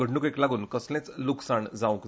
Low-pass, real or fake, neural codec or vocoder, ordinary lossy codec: none; real; none; none